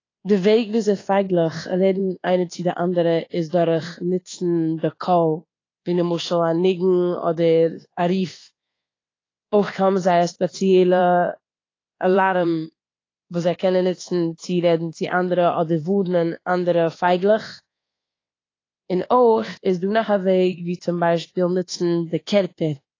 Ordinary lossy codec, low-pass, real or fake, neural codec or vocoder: AAC, 32 kbps; 7.2 kHz; fake; codec, 24 kHz, 1.2 kbps, DualCodec